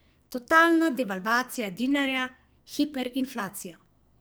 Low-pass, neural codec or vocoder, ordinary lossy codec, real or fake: none; codec, 44.1 kHz, 2.6 kbps, SNAC; none; fake